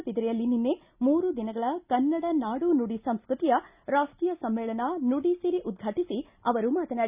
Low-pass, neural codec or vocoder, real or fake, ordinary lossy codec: 3.6 kHz; none; real; Opus, 64 kbps